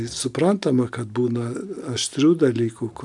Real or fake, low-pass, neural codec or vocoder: real; 10.8 kHz; none